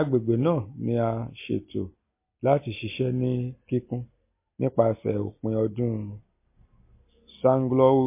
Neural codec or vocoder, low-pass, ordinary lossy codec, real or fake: none; 3.6 kHz; MP3, 24 kbps; real